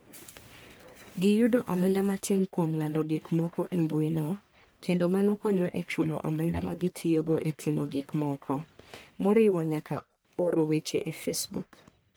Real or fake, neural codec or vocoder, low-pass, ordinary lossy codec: fake; codec, 44.1 kHz, 1.7 kbps, Pupu-Codec; none; none